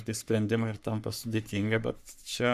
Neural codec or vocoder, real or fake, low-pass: codec, 44.1 kHz, 3.4 kbps, Pupu-Codec; fake; 14.4 kHz